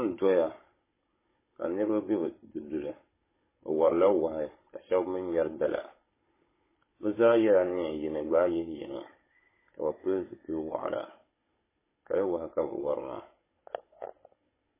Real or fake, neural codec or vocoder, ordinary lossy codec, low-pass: fake; codec, 16 kHz, 16 kbps, FunCodec, trained on Chinese and English, 50 frames a second; MP3, 16 kbps; 3.6 kHz